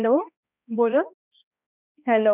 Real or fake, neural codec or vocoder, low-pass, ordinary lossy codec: fake; codec, 16 kHz, 1 kbps, X-Codec, HuBERT features, trained on balanced general audio; 3.6 kHz; none